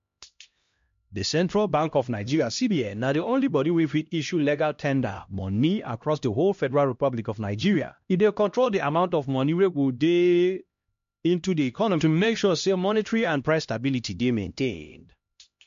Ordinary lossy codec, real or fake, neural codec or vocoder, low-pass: MP3, 48 kbps; fake; codec, 16 kHz, 1 kbps, X-Codec, HuBERT features, trained on LibriSpeech; 7.2 kHz